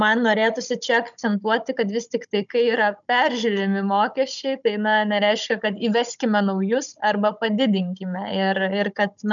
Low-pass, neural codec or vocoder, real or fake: 7.2 kHz; codec, 16 kHz, 16 kbps, FunCodec, trained on Chinese and English, 50 frames a second; fake